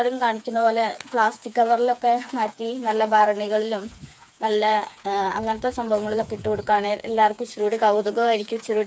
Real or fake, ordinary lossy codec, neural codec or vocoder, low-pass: fake; none; codec, 16 kHz, 4 kbps, FreqCodec, smaller model; none